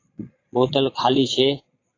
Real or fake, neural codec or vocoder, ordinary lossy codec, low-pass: fake; vocoder, 22.05 kHz, 80 mel bands, Vocos; AAC, 32 kbps; 7.2 kHz